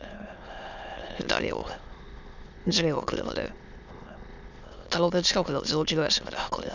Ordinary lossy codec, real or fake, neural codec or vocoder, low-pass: none; fake; autoencoder, 22.05 kHz, a latent of 192 numbers a frame, VITS, trained on many speakers; 7.2 kHz